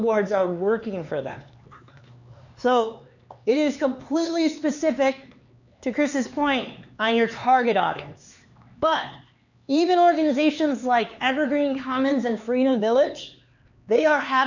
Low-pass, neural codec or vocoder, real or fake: 7.2 kHz; codec, 16 kHz, 4 kbps, X-Codec, HuBERT features, trained on LibriSpeech; fake